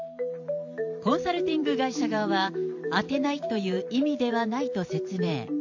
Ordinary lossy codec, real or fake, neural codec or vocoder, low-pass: AAC, 48 kbps; fake; vocoder, 44.1 kHz, 128 mel bands every 512 samples, BigVGAN v2; 7.2 kHz